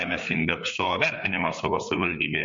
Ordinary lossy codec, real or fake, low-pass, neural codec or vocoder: MP3, 48 kbps; fake; 7.2 kHz; codec, 16 kHz, 4 kbps, FreqCodec, larger model